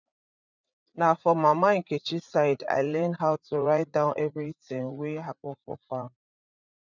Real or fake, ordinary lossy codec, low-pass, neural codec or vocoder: fake; none; 7.2 kHz; vocoder, 44.1 kHz, 80 mel bands, Vocos